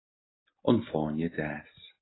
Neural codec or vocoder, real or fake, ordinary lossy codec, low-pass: none; real; AAC, 16 kbps; 7.2 kHz